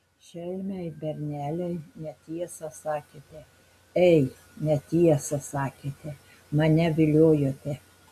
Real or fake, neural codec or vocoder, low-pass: real; none; 14.4 kHz